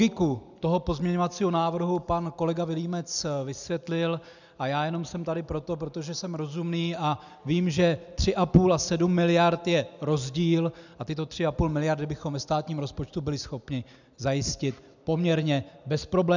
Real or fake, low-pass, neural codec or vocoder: real; 7.2 kHz; none